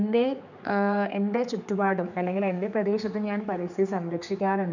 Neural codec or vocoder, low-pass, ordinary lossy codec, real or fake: codec, 16 kHz, 4 kbps, X-Codec, HuBERT features, trained on general audio; 7.2 kHz; none; fake